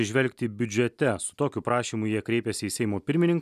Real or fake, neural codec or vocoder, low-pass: real; none; 14.4 kHz